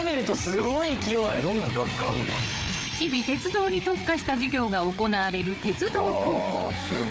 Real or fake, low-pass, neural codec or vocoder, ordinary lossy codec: fake; none; codec, 16 kHz, 4 kbps, FreqCodec, larger model; none